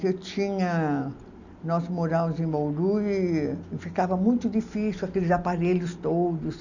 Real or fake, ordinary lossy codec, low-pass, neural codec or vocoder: real; none; 7.2 kHz; none